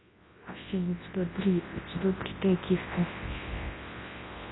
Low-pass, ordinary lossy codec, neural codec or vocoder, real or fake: 7.2 kHz; AAC, 16 kbps; codec, 24 kHz, 0.9 kbps, WavTokenizer, large speech release; fake